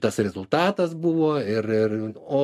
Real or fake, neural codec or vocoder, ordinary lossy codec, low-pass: real; none; MP3, 64 kbps; 14.4 kHz